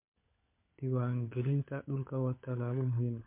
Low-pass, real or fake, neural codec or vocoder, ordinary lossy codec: 3.6 kHz; fake; codec, 16 kHz, 16 kbps, FunCodec, trained on LibriTTS, 50 frames a second; MP3, 32 kbps